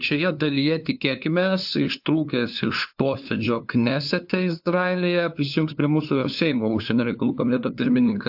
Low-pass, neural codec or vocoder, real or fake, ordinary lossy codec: 5.4 kHz; codec, 16 kHz, 2 kbps, FunCodec, trained on LibriTTS, 25 frames a second; fake; AAC, 48 kbps